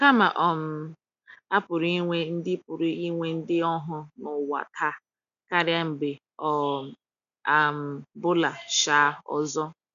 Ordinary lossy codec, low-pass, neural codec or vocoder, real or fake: none; 7.2 kHz; none; real